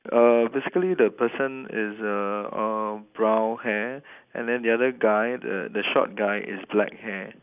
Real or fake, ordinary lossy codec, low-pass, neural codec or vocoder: real; none; 3.6 kHz; none